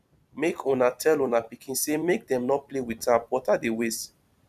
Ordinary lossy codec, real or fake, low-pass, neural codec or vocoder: none; fake; 14.4 kHz; vocoder, 44.1 kHz, 128 mel bands every 256 samples, BigVGAN v2